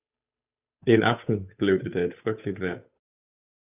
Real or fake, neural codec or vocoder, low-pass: fake; codec, 16 kHz, 8 kbps, FunCodec, trained on Chinese and English, 25 frames a second; 3.6 kHz